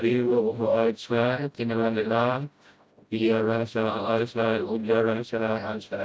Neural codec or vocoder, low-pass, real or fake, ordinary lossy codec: codec, 16 kHz, 0.5 kbps, FreqCodec, smaller model; none; fake; none